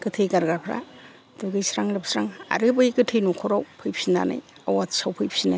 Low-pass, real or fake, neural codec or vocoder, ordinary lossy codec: none; real; none; none